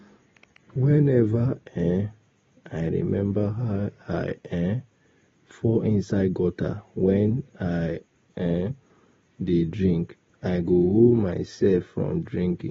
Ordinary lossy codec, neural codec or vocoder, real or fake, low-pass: AAC, 24 kbps; none; real; 7.2 kHz